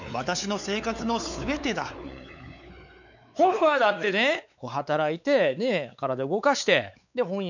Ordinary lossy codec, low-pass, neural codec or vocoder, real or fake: none; 7.2 kHz; codec, 16 kHz, 4 kbps, X-Codec, WavLM features, trained on Multilingual LibriSpeech; fake